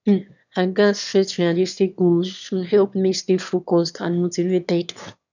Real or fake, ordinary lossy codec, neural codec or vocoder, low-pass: fake; none; autoencoder, 22.05 kHz, a latent of 192 numbers a frame, VITS, trained on one speaker; 7.2 kHz